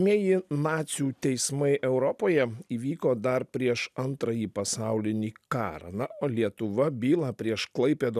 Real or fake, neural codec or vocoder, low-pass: real; none; 14.4 kHz